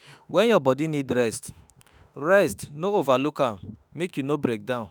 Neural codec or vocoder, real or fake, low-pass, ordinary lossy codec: autoencoder, 48 kHz, 32 numbers a frame, DAC-VAE, trained on Japanese speech; fake; none; none